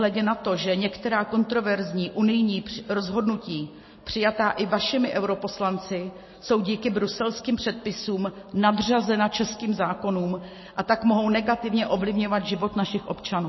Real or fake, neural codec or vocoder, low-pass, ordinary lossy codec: real; none; 7.2 kHz; MP3, 24 kbps